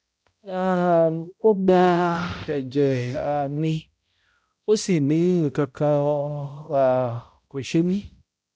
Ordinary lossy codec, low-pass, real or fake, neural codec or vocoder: none; none; fake; codec, 16 kHz, 0.5 kbps, X-Codec, HuBERT features, trained on balanced general audio